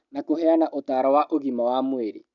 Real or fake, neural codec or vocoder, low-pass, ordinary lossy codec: real; none; 7.2 kHz; none